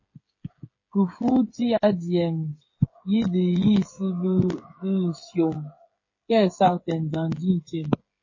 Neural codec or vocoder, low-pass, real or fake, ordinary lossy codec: codec, 16 kHz, 8 kbps, FreqCodec, smaller model; 7.2 kHz; fake; MP3, 32 kbps